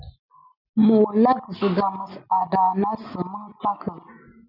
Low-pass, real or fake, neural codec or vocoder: 5.4 kHz; real; none